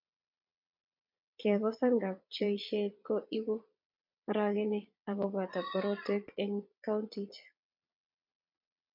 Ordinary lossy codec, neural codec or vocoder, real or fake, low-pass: MP3, 48 kbps; vocoder, 22.05 kHz, 80 mel bands, Vocos; fake; 5.4 kHz